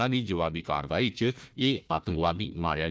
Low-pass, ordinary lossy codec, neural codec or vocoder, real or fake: none; none; codec, 16 kHz, 1 kbps, FunCodec, trained on Chinese and English, 50 frames a second; fake